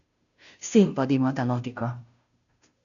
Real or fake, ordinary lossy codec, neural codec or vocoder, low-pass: fake; MP3, 96 kbps; codec, 16 kHz, 0.5 kbps, FunCodec, trained on Chinese and English, 25 frames a second; 7.2 kHz